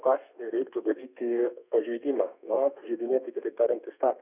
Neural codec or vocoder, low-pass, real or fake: codec, 32 kHz, 1.9 kbps, SNAC; 3.6 kHz; fake